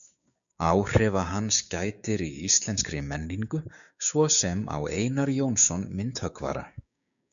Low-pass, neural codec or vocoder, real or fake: 7.2 kHz; codec, 16 kHz, 6 kbps, DAC; fake